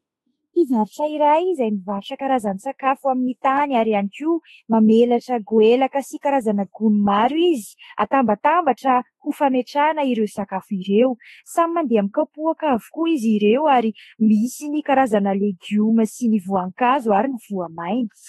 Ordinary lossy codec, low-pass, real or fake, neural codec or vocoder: AAC, 32 kbps; 19.8 kHz; fake; autoencoder, 48 kHz, 32 numbers a frame, DAC-VAE, trained on Japanese speech